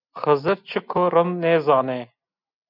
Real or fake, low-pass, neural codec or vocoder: real; 5.4 kHz; none